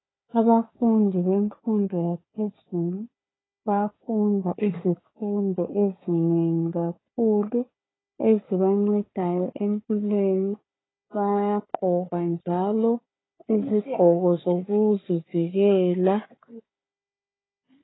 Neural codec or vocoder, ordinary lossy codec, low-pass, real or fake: codec, 16 kHz, 4 kbps, FunCodec, trained on Chinese and English, 50 frames a second; AAC, 16 kbps; 7.2 kHz; fake